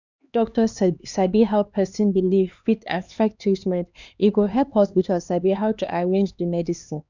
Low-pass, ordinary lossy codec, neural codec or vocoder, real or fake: 7.2 kHz; none; codec, 16 kHz, 2 kbps, X-Codec, HuBERT features, trained on LibriSpeech; fake